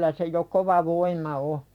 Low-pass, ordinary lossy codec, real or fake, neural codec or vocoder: 19.8 kHz; none; fake; vocoder, 44.1 kHz, 128 mel bands every 256 samples, BigVGAN v2